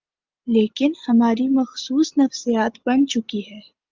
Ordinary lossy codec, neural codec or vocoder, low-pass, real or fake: Opus, 24 kbps; none; 7.2 kHz; real